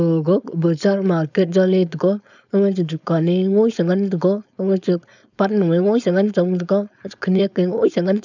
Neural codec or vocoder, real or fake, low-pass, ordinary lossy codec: codec, 16 kHz, 4.8 kbps, FACodec; fake; 7.2 kHz; none